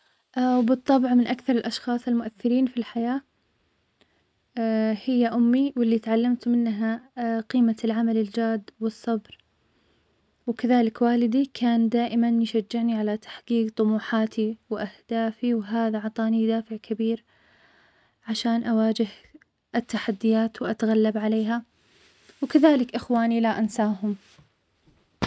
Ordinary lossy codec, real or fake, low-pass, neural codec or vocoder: none; real; none; none